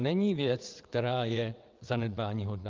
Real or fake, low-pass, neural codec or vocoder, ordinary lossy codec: fake; 7.2 kHz; vocoder, 22.05 kHz, 80 mel bands, WaveNeXt; Opus, 32 kbps